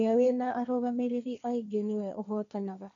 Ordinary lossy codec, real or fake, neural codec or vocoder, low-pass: none; fake; codec, 16 kHz, 1.1 kbps, Voila-Tokenizer; 7.2 kHz